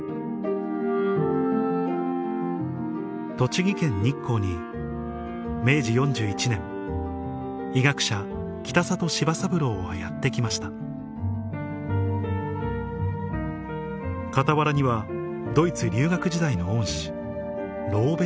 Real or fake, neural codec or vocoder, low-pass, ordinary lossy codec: real; none; none; none